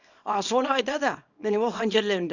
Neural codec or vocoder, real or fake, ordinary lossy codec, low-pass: codec, 24 kHz, 0.9 kbps, WavTokenizer, small release; fake; none; 7.2 kHz